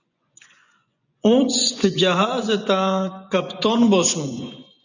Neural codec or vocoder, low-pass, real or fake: vocoder, 24 kHz, 100 mel bands, Vocos; 7.2 kHz; fake